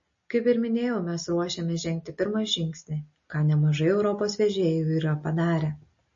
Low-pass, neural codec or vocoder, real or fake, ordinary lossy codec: 7.2 kHz; none; real; MP3, 32 kbps